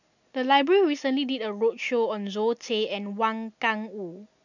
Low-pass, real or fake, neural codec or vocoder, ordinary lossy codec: 7.2 kHz; real; none; none